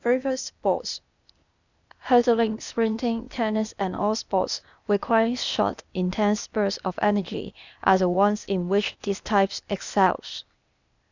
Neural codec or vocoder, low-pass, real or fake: codec, 16 kHz, 0.8 kbps, ZipCodec; 7.2 kHz; fake